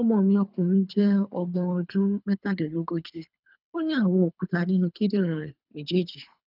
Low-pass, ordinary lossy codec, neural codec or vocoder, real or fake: 5.4 kHz; none; codec, 24 kHz, 3 kbps, HILCodec; fake